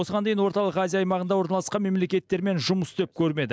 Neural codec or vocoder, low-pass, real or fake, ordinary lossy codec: none; none; real; none